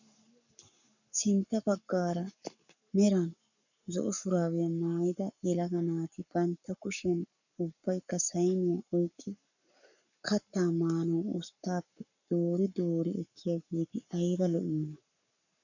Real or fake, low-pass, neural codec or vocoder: fake; 7.2 kHz; codec, 44.1 kHz, 7.8 kbps, Pupu-Codec